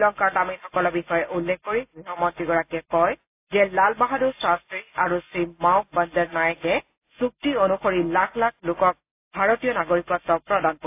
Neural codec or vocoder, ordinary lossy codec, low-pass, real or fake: none; AAC, 32 kbps; 3.6 kHz; real